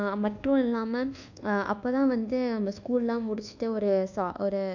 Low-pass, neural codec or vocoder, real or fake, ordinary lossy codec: 7.2 kHz; codec, 24 kHz, 1.2 kbps, DualCodec; fake; none